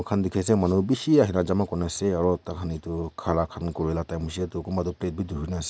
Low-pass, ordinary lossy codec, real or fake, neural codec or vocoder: none; none; real; none